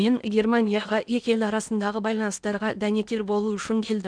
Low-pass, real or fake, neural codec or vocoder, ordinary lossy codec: 9.9 kHz; fake; codec, 16 kHz in and 24 kHz out, 0.8 kbps, FocalCodec, streaming, 65536 codes; none